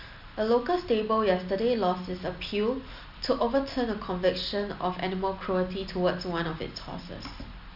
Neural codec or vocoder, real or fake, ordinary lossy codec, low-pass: none; real; none; 5.4 kHz